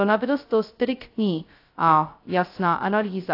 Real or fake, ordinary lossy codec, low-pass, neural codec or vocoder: fake; AAC, 32 kbps; 5.4 kHz; codec, 16 kHz, 0.2 kbps, FocalCodec